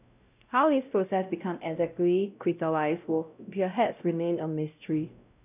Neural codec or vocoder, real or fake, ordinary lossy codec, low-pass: codec, 16 kHz, 0.5 kbps, X-Codec, WavLM features, trained on Multilingual LibriSpeech; fake; none; 3.6 kHz